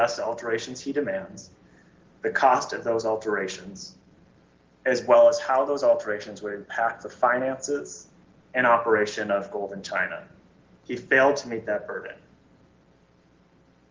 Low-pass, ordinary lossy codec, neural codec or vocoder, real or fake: 7.2 kHz; Opus, 16 kbps; none; real